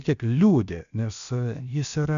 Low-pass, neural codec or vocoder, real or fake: 7.2 kHz; codec, 16 kHz, about 1 kbps, DyCAST, with the encoder's durations; fake